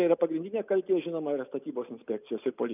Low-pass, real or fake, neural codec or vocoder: 3.6 kHz; real; none